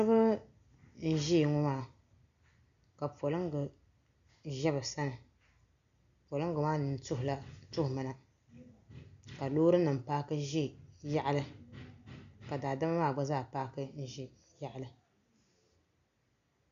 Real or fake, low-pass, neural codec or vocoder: real; 7.2 kHz; none